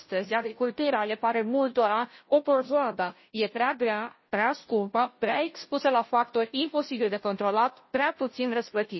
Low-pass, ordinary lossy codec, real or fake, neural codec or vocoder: 7.2 kHz; MP3, 24 kbps; fake; codec, 16 kHz, 0.5 kbps, FunCodec, trained on Chinese and English, 25 frames a second